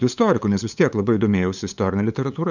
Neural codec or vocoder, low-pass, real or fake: codec, 16 kHz, 8 kbps, FunCodec, trained on LibriTTS, 25 frames a second; 7.2 kHz; fake